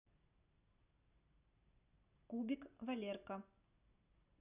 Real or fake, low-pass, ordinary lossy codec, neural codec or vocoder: real; 3.6 kHz; none; none